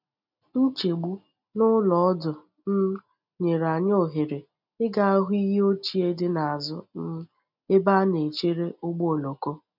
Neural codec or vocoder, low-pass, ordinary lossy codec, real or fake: none; 5.4 kHz; none; real